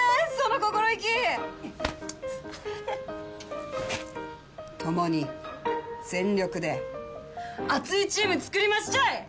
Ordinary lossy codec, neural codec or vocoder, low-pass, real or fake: none; none; none; real